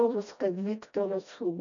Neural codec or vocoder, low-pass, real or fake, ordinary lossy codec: codec, 16 kHz, 1 kbps, FreqCodec, smaller model; 7.2 kHz; fake; MP3, 96 kbps